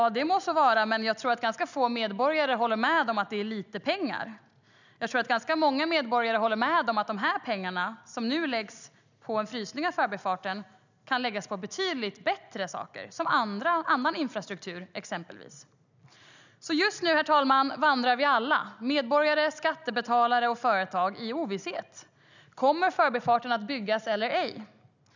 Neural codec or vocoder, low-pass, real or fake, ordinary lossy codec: none; 7.2 kHz; real; none